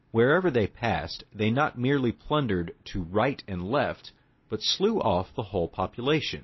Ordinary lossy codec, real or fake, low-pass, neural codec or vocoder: MP3, 24 kbps; real; 7.2 kHz; none